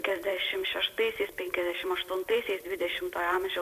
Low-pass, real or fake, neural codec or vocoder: 14.4 kHz; fake; vocoder, 44.1 kHz, 128 mel bands every 512 samples, BigVGAN v2